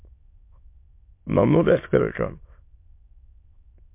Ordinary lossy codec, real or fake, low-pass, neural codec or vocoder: MP3, 32 kbps; fake; 3.6 kHz; autoencoder, 22.05 kHz, a latent of 192 numbers a frame, VITS, trained on many speakers